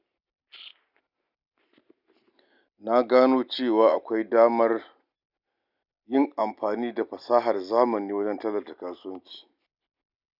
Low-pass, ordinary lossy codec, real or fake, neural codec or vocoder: 5.4 kHz; none; real; none